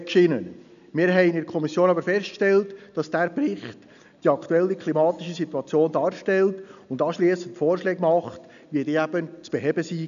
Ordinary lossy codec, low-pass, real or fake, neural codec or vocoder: none; 7.2 kHz; real; none